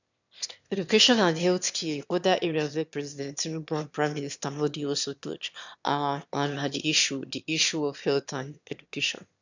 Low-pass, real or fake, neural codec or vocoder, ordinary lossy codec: 7.2 kHz; fake; autoencoder, 22.05 kHz, a latent of 192 numbers a frame, VITS, trained on one speaker; none